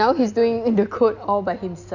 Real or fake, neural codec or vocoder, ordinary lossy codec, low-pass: real; none; none; 7.2 kHz